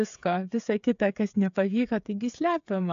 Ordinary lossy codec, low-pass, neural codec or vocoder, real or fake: AAC, 64 kbps; 7.2 kHz; codec, 16 kHz, 8 kbps, FreqCodec, smaller model; fake